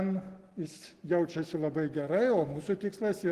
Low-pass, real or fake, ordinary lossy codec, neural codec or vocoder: 14.4 kHz; real; Opus, 16 kbps; none